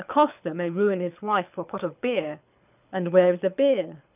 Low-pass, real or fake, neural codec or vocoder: 3.6 kHz; fake; codec, 24 kHz, 6 kbps, HILCodec